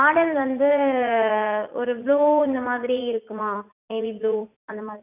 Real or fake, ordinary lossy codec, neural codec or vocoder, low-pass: fake; none; vocoder, 44.1 kHz, 80 mel bands, Vocos; 3.6 kHz